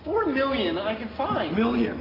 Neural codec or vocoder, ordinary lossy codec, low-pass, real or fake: none; AAC, 24 kbps; 5.4 kHz; real